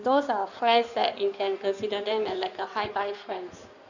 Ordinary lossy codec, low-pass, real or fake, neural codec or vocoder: none; 7.2 kHz; fake; codec, 16 kHz in and 24 kHz out, 2.2 kbps, FireRedTTS-2 codec